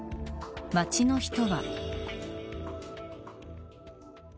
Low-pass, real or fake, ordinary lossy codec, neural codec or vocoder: none; real; none; none